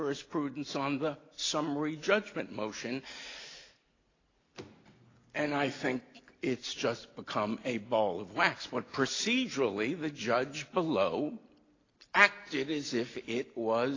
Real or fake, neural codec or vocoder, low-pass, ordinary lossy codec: real; none; 7.2 kHz; AAC, 32 kbps